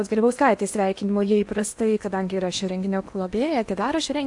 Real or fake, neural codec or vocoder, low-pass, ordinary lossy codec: fake; codec, 16 kHz in and 24 kHz out, 0.8 kbps, FocalCodec, streaming, 65536 codes; 10.8 kHz; AAC, 64 kbps